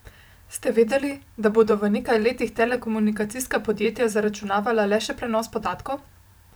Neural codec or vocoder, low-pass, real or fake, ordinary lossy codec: vocoder, 44.1 kHz, 128 mel bands every 512 samples, BigVGAN v2; none; fake; none